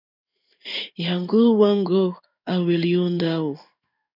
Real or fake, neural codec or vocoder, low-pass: fake; codec, 16 kHz in and 24 kHz out, 1 kbps, XY-Tokenizer; 5.4 kHz